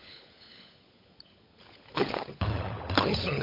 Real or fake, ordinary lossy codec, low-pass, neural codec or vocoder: fake; MP3, 48 kbps; 5.4 kHz; codec, 16 kHz, 16 kbps, FunCodec, trained on LibriTTS, 50 frames a second